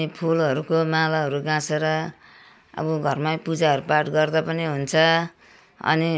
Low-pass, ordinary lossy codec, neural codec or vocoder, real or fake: none; none; none; real